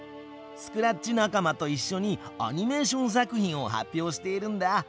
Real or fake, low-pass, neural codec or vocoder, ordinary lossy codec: real; none; none; none